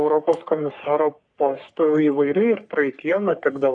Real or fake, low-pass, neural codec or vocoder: fake; 9.9 kHz; codec, 44.1 kHz, 3.4 kbps, Pupu-Codec